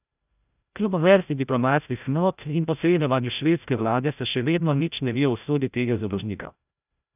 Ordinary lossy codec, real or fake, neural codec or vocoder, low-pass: none; fake; codec, 16 kHz, 0.5 kbps, FreqCodec, larger model; 3.6 kHz